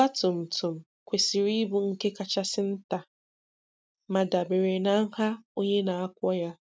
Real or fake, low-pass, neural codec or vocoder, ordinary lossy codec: real; none; none; none